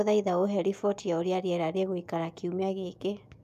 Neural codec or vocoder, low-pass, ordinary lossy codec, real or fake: autoencoder, 48 kHz, 128 numbers a frame, DAC-VAE, trained on Japanese speech; 14.4 kHz; none; fake